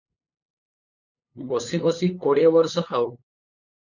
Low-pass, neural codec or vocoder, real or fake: 7.2 kHz; codec, 16 kHz, 8 kbps, FunCodec, trained on LibriTTS, 25 frames a second; fake